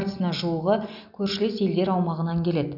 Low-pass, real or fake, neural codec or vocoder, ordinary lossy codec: 5.4 kHz; real; none; none